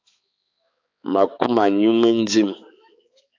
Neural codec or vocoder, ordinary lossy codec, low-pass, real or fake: codec, 16 kHz, 4 kbps, X-Codec, HuBERT features, trained on balanced general audio; AAC, 48 kbps; 7.2 kHz; fake